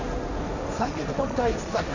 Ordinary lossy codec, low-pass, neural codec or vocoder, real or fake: none; 7.2 kHz; codec, 16 kHz, 1.1 kbps, Voila-Tokenizer; fake